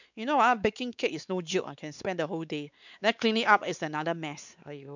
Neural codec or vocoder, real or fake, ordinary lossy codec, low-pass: codec, 16 kHz, 4 kbps, X-Codec, WavLM features, trained on Multilingual LibriSpeech; fake; none; 7.2 kHz